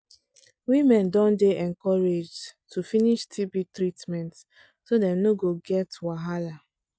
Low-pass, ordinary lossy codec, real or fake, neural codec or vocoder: none; none; real; none